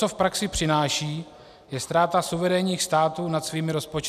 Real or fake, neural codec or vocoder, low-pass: real; none; 14.4 kHz